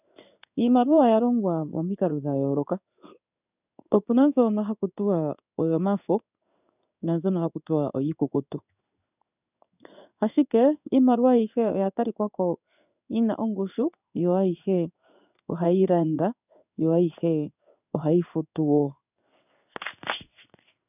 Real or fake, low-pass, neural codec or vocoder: fake; 3.6 kHz; codec, 16 kHz in and 24 kHz out, 1 kbps, XY-Tokenizer